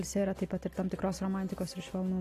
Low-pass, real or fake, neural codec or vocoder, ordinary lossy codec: 14.4 kHz; real; none; AAC, 48 kbps